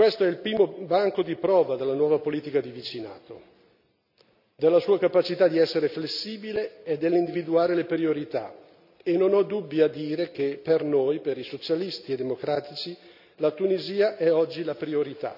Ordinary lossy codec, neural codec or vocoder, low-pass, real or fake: none; none; 5.4 kHz; real